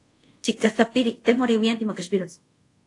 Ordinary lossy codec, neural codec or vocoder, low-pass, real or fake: AAC, 48 kbps; codec, 24 kHz, 0.5 kbps, DualCodec; 10.8 kHz; fake